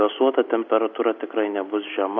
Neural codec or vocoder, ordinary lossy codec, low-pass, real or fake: none; MP3, 48 kbps; 7.2 kHz; real